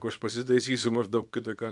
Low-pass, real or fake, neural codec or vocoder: 10.8 kHz; fake; codec, 24 kHz, 0.9 kbps, WavTokenizer, small release